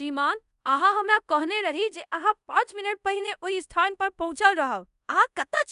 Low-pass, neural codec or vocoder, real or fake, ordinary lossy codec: 10.8 kHz; codec, 24 kHz, 0.5 kbps, DualCodec; fake; none